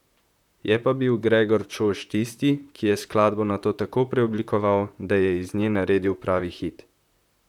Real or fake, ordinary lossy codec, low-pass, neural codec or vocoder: fake; none; 19.8 kHz; vocoder, 44.1 kHz, 128 mel bands, Pupu-Vocoder